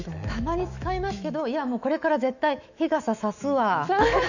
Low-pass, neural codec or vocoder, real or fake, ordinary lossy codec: 7.2 kHz; autoencoder, 48 kHz, 128 numbers a frame, DAC-VAE, trained on Japanese speech; fake; none